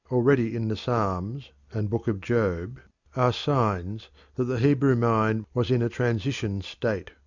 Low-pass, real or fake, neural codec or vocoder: 7.2 kHz; real; none